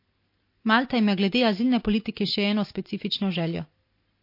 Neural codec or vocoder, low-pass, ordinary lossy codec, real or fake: none; 5.4 kHz; MP3, 32 kbps; real